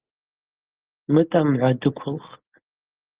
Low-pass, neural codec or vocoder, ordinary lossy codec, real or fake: 3.6 kHz; none; Opus, 16 kbps; real